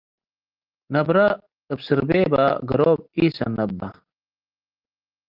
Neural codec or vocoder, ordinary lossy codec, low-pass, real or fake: none; Opus, 32 kbps; 5.4 kHz; real